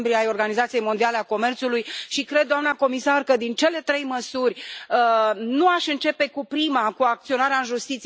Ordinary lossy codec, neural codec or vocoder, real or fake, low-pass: none; none; real; none